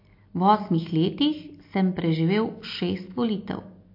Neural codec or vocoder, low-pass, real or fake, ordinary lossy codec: none; 5.4 kHz; real; MP3, 32 kbps